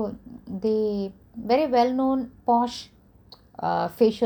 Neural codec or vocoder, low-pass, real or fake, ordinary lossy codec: none; 19.8 kHz; real; none